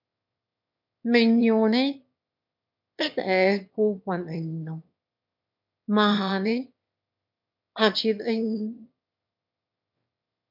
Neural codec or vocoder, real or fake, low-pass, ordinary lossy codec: autoencoder, 22.05 kHz, a latent of 192 numbers a frame, VITS, trained on one speaker; fake; 5.4 kHz; MP3, 48 kbps